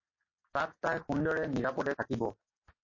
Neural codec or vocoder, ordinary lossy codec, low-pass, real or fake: none; MP3, 32 kbps; 7.2 kHz; real